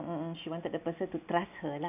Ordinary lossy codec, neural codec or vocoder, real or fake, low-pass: none; none; real; 3.6 kHz